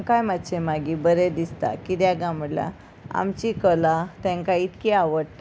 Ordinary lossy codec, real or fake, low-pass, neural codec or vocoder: none; real; none; none